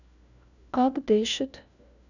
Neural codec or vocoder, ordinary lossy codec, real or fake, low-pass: codec, 16 kHz, 1 kbps, FunCodec, trained on LibriTTS, 50 frames a second; none; fake; 7.2 kHz